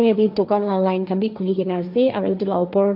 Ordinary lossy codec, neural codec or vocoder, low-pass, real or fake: none; codec, 16 kHz, 1.1 kbps, Voila-Tokenizer; 5.4 kHz; fake